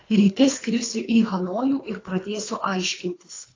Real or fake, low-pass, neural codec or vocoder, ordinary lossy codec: fake; 7.2 kHz; codec, 24 kHz, 3 kbps, HILCodec; AAC, 32 kbps